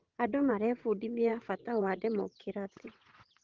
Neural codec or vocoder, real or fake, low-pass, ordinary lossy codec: vocoder, 22.05 kHz, 80 mel bands, WaveNeXt; fake; 7.2 kHz; Opus, 16 kbps